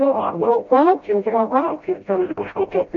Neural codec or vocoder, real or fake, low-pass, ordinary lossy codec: codec, 16 kHz, 0.5 kbps, FreqCodec, smaller model; fake; 7.2 kHz; MP3, 48 kbps